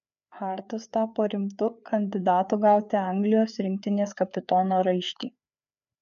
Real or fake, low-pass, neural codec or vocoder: fake; 7.2 kHz; codec, 16 kHz, 4 kbps, FreqCodec, larger model